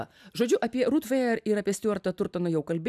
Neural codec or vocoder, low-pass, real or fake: none; 14.4 kHz; real